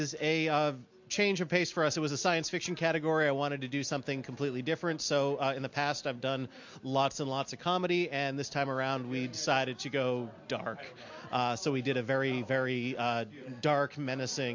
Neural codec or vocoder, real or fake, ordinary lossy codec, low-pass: none; real; MP3, 48 kbps; 7.2 kHz